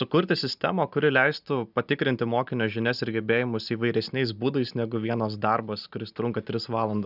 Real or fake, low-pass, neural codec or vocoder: real; 5.4 kHz; none